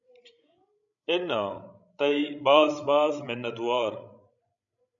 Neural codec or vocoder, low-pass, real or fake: codec, 16 kHz, 16 kbps, FreqCodec, larger model; 7.2 kHz; fake